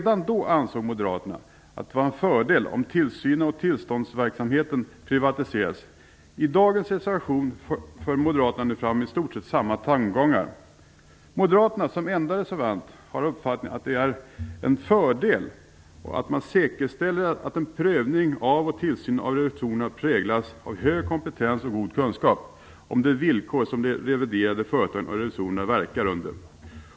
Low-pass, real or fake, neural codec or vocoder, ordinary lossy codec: none; real; none; none